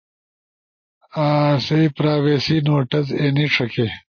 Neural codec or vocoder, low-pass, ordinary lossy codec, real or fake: none; 7.2 kHz; MP3, 32 kbps; real